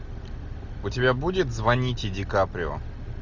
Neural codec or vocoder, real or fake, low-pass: none; real; 7.2 kHz